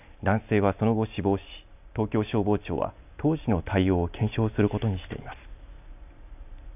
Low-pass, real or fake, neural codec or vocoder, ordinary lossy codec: 3.6 kHz; real; none; none